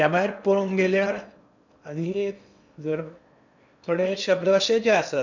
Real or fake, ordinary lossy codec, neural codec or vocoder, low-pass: fake; none; codec, 16 kHz in and 24 kHz out, 0.8 kbps, FocalCodec, streaming, 65536 codes; 7.2 kHz